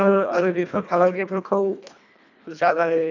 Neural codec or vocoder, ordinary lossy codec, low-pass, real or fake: codec, 24 kHz, 1.5 kbps, HILCodec; none; 7.2 kHz; fake